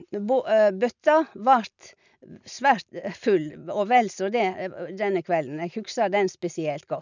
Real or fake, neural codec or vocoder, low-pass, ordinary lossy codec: real; none; 7.2 kHz; none